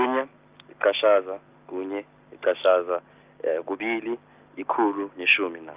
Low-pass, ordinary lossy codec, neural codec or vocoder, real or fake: 3.6 kHz; Opus, 24 kbps; none; real